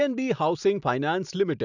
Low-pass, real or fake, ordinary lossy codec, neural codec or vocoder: 7.2 kHz; real; none; none